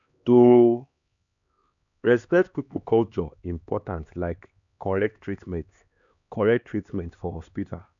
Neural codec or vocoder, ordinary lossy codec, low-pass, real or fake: codec, 16 kHz, 2 kbps, X-Codec, HuBERT features, trained on LibriSpeech; MP3, 96 kbps; 7.2 kHz; fake